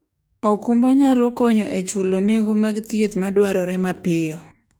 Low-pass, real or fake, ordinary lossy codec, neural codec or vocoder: none; fake; none; codec, 44.1 kHz, 2.6 kbps, DAC